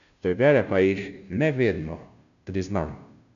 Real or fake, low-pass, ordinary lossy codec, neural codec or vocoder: fake; 7.2 kHz; none; codec, 16 kHz, 0.5 kbps, FunCodec, trained on Chinese and English, 25 frames a second